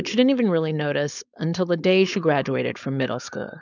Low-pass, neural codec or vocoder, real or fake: 7.2 kHz; codec, 16 kHz, 4.8 kbps, FACodec; fake